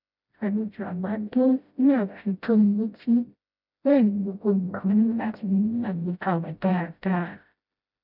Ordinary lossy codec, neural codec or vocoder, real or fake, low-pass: none; codec, 16 kHz, 0.5 kbps, FreqCodec, smaller model; fake; 5.4 kHz